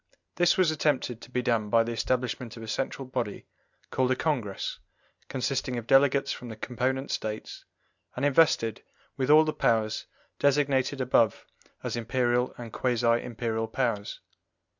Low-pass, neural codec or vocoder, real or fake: 7.2 kHz; none; real